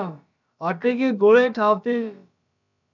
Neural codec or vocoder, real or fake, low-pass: codec, 16 kHz, about 1 kbps, DyCAST, with the encoder's durations; fake; 7.2 kHz